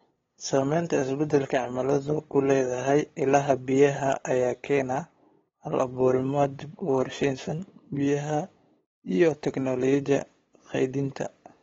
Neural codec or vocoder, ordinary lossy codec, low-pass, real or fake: codec, 16 kHz, 8 kbps, FunCodec, trained on LibriTTS, 25 frames a second; AAC, 24 kbps; 7.2 kHz; fake